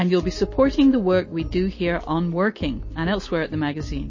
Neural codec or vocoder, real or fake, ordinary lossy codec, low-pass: none; real; MP3, 32 kbps; 7.2 kHz